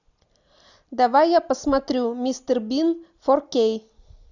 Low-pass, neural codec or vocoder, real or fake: 7.2 kHz; none; real